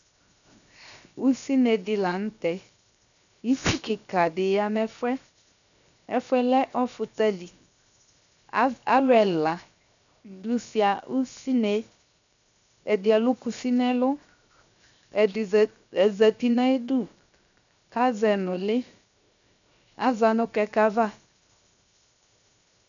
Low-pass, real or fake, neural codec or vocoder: 7.2 kHz; fake; codec, 16 kHz, 0.7 kbps, FocalCodec